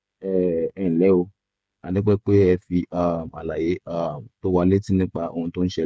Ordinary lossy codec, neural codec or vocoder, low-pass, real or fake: none; codec, 16 kHz, 8 kbps, FreqCodec, smaller model; none; fake